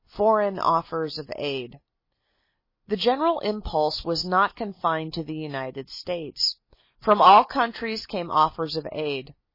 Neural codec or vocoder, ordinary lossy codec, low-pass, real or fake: none; MP3, 24 kbps; 5.4 kHz; real